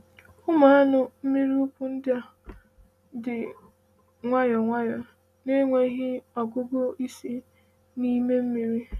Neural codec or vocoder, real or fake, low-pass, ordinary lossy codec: none; real; 14.4 kHz; none